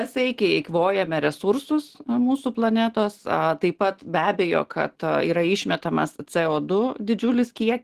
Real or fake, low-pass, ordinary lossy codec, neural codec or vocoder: real; 14.4 kHz; Opus, 16 kbps; none